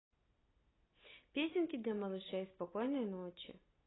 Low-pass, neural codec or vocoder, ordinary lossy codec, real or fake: 7.2 kHz; none; AAC, 16 kbps; real